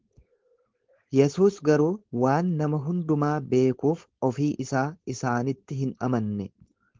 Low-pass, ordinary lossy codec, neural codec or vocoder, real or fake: 7.2 kHz; Opus, 16 kbps; codec, 16 kHz, 4.8 kbps, FACodec; fake